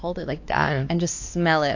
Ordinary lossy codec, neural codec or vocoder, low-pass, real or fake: AAC, 48 kbps; codec, 16 kHz, 2 kbps, X-Codec, WavLM features, trained on Multilingual LibriSpeech; 7.2 kHz; fake